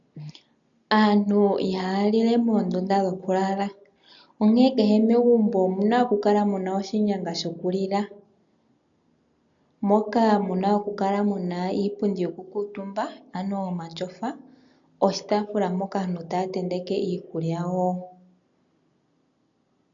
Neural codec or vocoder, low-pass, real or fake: none; 7.2 kHz; real